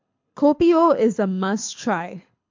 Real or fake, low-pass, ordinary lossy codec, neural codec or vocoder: fake; 7.2 kHz; MP3, 48 kbps; codec, 24 kHz, 6 kbps, HILCodec